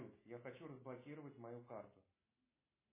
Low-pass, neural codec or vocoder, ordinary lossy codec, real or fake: 3.6 kHz; none; MP3, 16 kbps; real